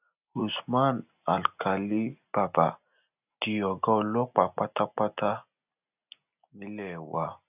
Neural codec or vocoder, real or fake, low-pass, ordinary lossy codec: none; real; 3.6 kHz; none